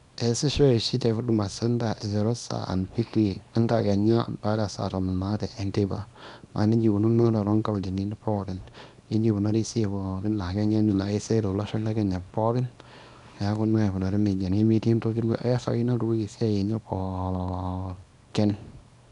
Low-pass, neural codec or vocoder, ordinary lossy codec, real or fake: 10.8 kHz; codec, 24 kHz, 0.9 kbps, WavTokenizer, small release; none; fake